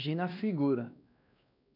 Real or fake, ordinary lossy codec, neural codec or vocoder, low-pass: fake; none; codec, 16 kHz, 2 kbps, X-Codec, WavLM features, trained on Multilingual LibriSpeech; 5.4 kHz